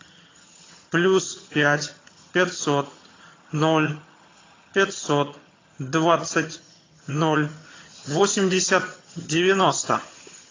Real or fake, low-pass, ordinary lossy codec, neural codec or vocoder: fake; 7.2 kHz; AAC, 32 kbps; vocoder, 22.05 kHz, 80 mel bands, HiFi-GAN